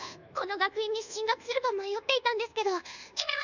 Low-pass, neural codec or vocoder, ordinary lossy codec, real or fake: 7.2 kHz; codec, 24 kHz, 1.2 kbps, DualCodec; none; fake